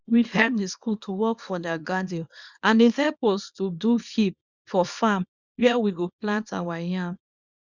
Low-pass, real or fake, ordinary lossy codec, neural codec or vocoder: 7.2 kHz; fake; Opus, 64 kbps; codec, 24 kHz, 0.9 kbps, WavTokenizer, small release